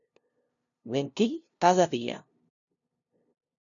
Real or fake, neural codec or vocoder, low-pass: fake; codec, 16 kHz, 0.5 kbps, FunCodec, trained on LibriTTS, 25 frames a second; 7.2 kHz